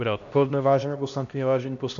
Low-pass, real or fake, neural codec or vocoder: 7.2 kHz; fake; codec, 16 kHz, 1 kbps, X-Codec, HuBERT features, trained on LibriSpeech